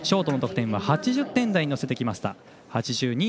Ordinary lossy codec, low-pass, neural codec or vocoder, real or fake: none; none; none; real